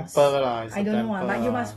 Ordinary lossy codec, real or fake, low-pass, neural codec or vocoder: AAC, 32 kbps; real; 19.8 kHz; none